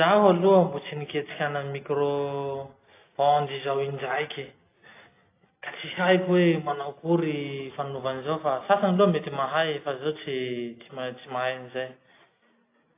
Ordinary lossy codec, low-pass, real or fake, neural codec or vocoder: AAC, 24 kbps; 3.6 kHz; real; none